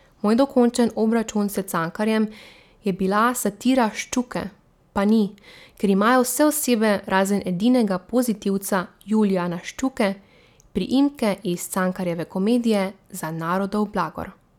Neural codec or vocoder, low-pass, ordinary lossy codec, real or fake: none; 19.8 kHz; none; real